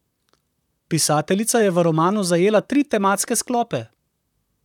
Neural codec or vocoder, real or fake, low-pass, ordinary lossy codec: vocoder, 44.1 kHz, 128 mel bands, Pupu-Vocoder; fake; 19.8 kHz; none